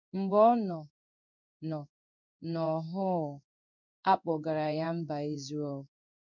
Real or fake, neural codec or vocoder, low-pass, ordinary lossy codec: fake; codec, 16 kHz in and 24 kHz out, 1 kbps, XY-Tokenizer; 7.2 kHz; none